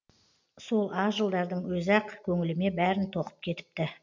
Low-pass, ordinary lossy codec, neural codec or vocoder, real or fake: 7.2 kHz; MP3, 64 kbps; none; real